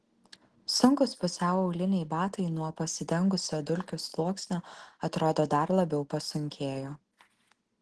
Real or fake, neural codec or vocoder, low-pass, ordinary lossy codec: real; none; 10.8 kHz; Opus, 16 kbps